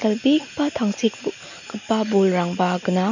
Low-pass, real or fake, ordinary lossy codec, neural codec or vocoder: 7.2 kHz; real; none; none